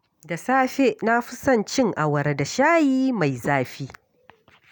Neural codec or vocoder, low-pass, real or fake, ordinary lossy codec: none; none; real; none